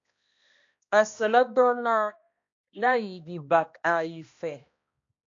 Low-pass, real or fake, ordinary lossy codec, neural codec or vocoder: 7.2 kHz; fake; AAC, 48 kbps; codec, 16 kHz, 1 kbps, X-Codec, HuBERT features, trained on balanced general audio